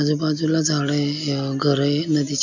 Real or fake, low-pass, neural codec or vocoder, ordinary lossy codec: real; 7.2 kHz; none; none